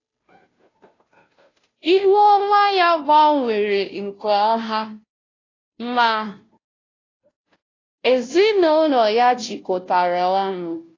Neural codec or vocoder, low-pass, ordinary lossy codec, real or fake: codec, 16 kHz, 0.5 kbps, FunCodec, trained on Chinese and English, 25 frames a second; 7.2 kHz; AAC, 32 kbps; fake